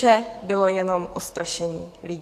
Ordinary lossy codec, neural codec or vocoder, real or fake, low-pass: AAC, 64 kbps; codec, 44.1 kHz, 2.6 kbps, SNAC; fake; 14.4 kHz